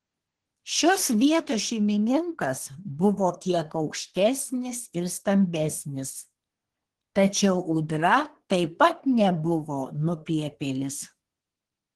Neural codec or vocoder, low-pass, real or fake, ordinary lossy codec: codec, 24 kHz, 1 kbps, SNAC; 10.8 kHz; fake; Opus, 16 kbps